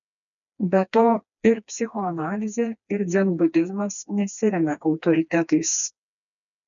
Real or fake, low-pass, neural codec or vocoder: fake; 7.2 kHz; codec, 16 kHz, 2 kbps, FreqCodec, smaller model